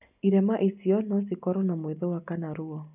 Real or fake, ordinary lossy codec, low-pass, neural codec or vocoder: real; none; 3.6 kHz; none